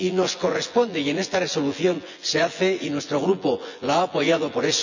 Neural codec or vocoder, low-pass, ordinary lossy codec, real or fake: vocoder, 24 kHz, 100 mel bands, Vocos; 7.2 kHz; none; fake